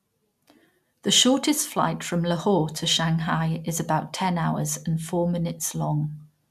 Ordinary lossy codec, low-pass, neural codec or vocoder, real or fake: none; 14.4 kHz; none; real